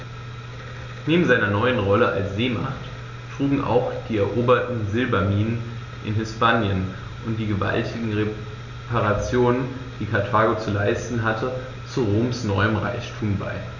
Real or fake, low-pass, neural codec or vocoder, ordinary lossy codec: real; 7.2 kHz; none; none